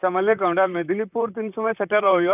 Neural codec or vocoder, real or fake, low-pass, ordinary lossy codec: vocoder, 44.1 kHz, 128 mel bands, Pupu-Vocoder; fake; 3.6 kHz; none